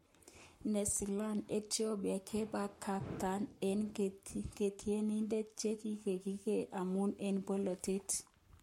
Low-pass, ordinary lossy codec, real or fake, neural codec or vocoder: 19.8 kHz; MP3, 64 kbps; fake; codec, 44.1 kHz, 7.8 kbps, Pupu-Codec